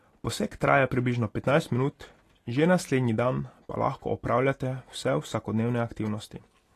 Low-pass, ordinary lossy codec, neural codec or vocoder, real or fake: 14.4 kHz; AAC, 48 kbps; none; real